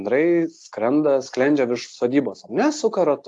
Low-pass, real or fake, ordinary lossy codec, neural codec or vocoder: 10.8 kHz; real; AAC, 64 kbps; none